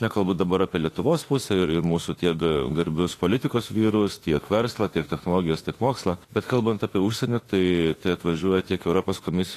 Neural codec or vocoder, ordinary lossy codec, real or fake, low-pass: autoencoder, 48 kHz, 32 numbers a frame, DAC-VAE, trained on Japanese speech; AAC, 48 kbps; fake; 14.4 kHz